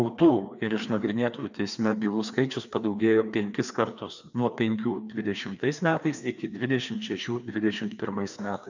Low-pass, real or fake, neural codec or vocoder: 7.2 kHz; fake; codec, 16 kHz, 2 kbps, FreqCodec, larger model